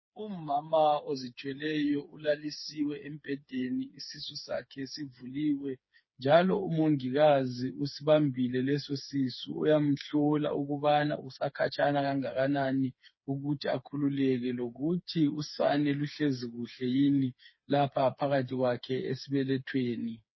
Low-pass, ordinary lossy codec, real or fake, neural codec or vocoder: 7.2 kHz; MP3, 24 kbps; fake; codec, 16 kHz, 4 kbps, FreqCodec, smaller model